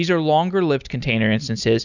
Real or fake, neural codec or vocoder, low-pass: real; none; 7.2 kHz